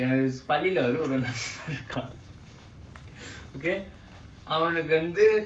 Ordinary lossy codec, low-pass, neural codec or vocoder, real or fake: MP3, 64 kbps; 9.9 kHz; codec, 44.1 kHz, 7.8 kbps, Pupu-Codec; fake